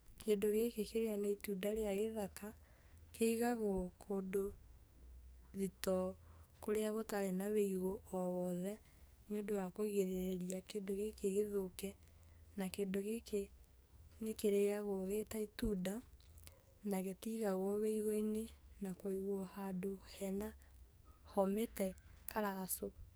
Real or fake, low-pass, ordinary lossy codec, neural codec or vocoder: fake; none; none; codec, 44.1 kHz, 2.6 kbps, SNAC